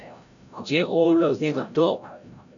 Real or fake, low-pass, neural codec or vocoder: fake; 7.2 kHz; codec, 16 kHz, 0.5 kbps, FreqCodec, larger model